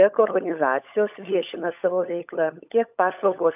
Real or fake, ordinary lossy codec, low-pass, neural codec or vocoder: fake; AAC, 32 kbps; 3.6 kHz; codec, 16 kHz, 16 kbps, FunCodec, trained on LibriTTS, 50 frames a second